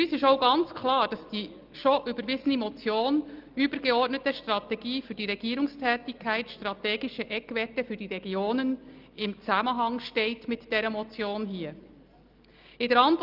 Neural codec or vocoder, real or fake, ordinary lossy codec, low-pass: none; real; Opus, 32 kbps; 5.4 kHz